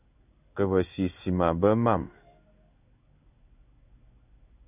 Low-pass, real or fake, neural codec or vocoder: 3.6 kHz; fake; codec, 16 kHz in and 24 kHz out, 1 kbps, XY-Tokenizer